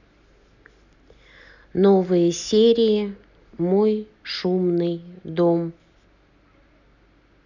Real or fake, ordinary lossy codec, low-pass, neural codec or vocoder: real; none; 7.2 kHz; none